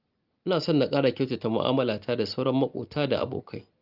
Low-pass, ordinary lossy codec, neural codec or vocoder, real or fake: 5.4 kHz; Opus, 32 kbps; none; real